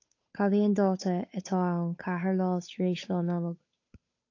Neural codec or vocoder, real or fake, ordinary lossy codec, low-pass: codec, 16 kHz, 8 kbps, FunCodec, trained on Chinese and English, 25 frames a second; fake; AAC, 48 kbps; 7.2 kHz